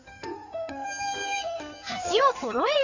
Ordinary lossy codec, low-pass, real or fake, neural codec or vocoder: none; 7.2 kHz; fake; codec, 16 kHz in and 24 kHz out, 2.2 kbps, FireRedTTS-2 codec